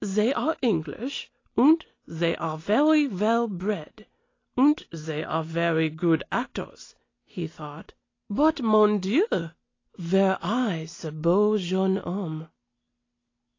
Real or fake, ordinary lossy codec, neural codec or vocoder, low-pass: real; AAC, 32 kbps; none; 7.2 kHz